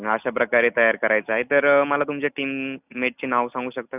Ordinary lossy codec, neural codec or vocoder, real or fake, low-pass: none; none; real; 3.6 kHz